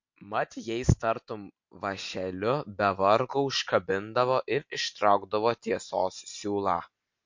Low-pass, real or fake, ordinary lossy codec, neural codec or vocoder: 7.2 kHz; real; MP3, 48 kbps; none